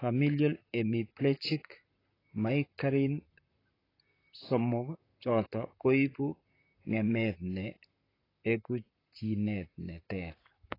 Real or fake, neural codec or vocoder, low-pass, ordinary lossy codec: fake; vocoder, 44.1 kHz, 128 mel bands, Pupu-Vocoder; 5.4 kHz; AAC, 24 kbps